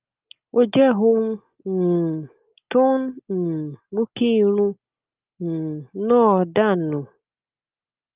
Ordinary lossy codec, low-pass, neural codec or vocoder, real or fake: Opus, 24 kbps; 3.6 kHz; none; real